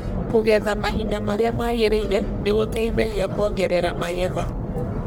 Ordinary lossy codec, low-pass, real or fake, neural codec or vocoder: none; none; fake; codec, 44.1 kHz, 1.7 kbps, Pupu-Codec